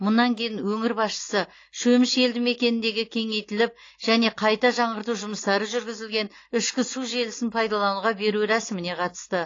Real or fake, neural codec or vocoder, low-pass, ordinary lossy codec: real; none; 7.2 kHz; AAC, 32 kbps